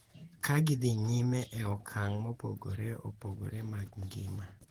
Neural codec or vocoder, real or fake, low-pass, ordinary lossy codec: vocoder, 48 kHz, 128 mel bands, Vocos; fake; 19.8 kHz; Opus, 16 kbps